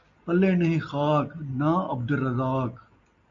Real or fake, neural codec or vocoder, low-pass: real; none; 7.2 kHz